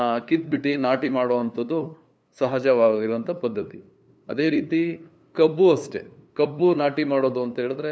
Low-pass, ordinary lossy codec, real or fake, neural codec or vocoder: none; none; fake; codec, 16 kHz, 2 kbps, FunCodec, trained on LibriTTS, 25 frames a second